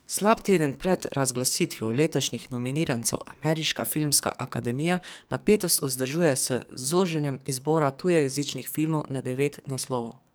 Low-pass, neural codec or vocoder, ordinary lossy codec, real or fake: none; codec, 44.1 kHz, 2.6 kbps, SNAC; none; fake